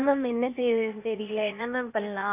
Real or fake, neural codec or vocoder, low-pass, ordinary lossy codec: fake; codec, 16 kHz, 0.8 kbps, ZipCodec; 3.6 kHz; none